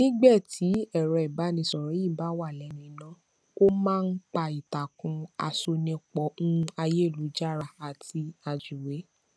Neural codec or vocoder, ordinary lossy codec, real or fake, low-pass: none; none; real; none